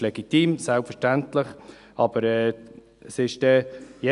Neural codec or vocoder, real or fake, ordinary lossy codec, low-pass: none; real; none; 10.8 kHz